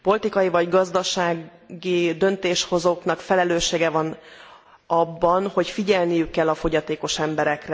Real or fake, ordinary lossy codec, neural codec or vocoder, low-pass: real; none; none; none